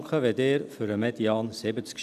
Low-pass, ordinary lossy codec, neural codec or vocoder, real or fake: 14.4 kHz; none; none; real